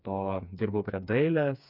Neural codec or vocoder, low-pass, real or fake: codec, 16 kHz, 4 kbps, FreqCodec, smaller model; 5.4 kHz; fake